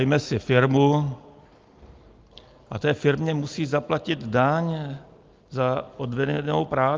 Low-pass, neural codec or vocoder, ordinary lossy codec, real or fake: 7.2 kHz; none; Opus, 32 kbps; real